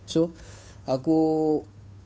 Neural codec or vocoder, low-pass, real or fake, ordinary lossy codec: codec, 16 kHz, 2 kbps, FunCodec, trained on Chinese and English, 25 frames a second; none; fake; none